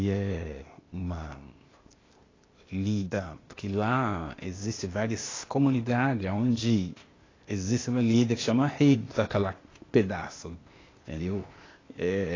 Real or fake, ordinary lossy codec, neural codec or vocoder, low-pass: fake; AAC, 32 kbps; codec, 16 kHz, 0.8 kbps, ZipCodec; 7.2 kHz